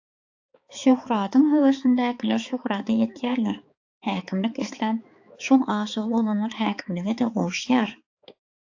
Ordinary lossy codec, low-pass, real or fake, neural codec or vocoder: AAC, 48 kbps; 7.2 kHz; fake; codec, 16 kHz, 4 kbps, X-Codec, HuBERT features, trained on balanced general audio